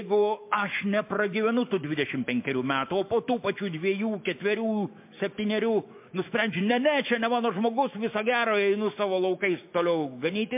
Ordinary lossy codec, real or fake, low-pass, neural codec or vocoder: MP3, 32 kbps; fake; 3.6 kHz; autoencoder, 48 kHz, 128 numbers a frame, DAC-VAE, trained on Japanese speech